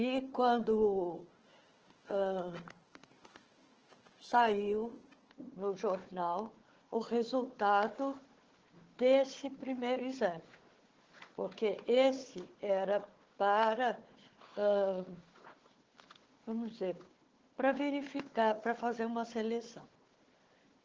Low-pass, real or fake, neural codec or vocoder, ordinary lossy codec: 7.2 kHz; fake; codec, 16 kHz, 4 kbps, FunCodec, trained on Chinese and English, 50 frames a second; Opus, 16 kbps